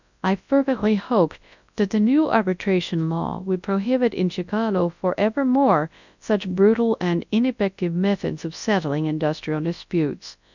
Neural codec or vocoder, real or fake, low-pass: codec, 24 kHz, 0.9 kbps, WavTokenizer, large speech release; fake; 7.2 kHz